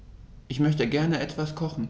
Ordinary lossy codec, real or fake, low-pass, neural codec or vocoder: none; real; none; none